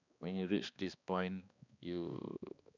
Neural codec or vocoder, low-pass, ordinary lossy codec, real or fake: codec, 16 kHz, 2 kbps, X-Codec, HuBERT features, trained on balanced general audio; 7.2 kHz; none; fake